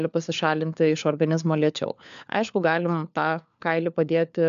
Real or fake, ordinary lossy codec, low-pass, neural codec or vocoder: fake; MP3, 64 kbps; 7.2 kHz; codec, 16 kHz, 4 kbps, FunCodec, trained on LibriTTS, 50 frames a second